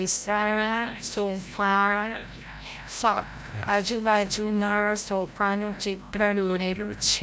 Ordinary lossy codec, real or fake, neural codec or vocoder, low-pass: none; fake; codec, 16 kHz, 0.5 kbps, FreqCodec, larger model; none